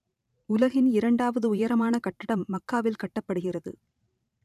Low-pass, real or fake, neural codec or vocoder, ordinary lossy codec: 14.4 kHz; fake; vocoder, 44.1 kHz, 128 mel bands every 512 samples, BigVGAN v2; none